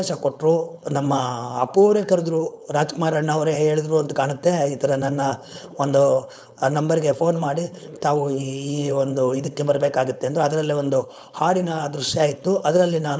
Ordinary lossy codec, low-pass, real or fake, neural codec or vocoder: none; none; fake; codec, 16 kHz, 4.8 kbps, FACodec